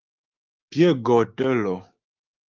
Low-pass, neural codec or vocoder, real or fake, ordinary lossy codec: 7.2 kHz; codec, 16 kHz, 6 kbps, DAC; fake; Opus, 32 kbps